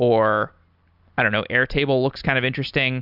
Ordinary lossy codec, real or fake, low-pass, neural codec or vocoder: Opus, 64 kbps; real; 5.4 kHz; none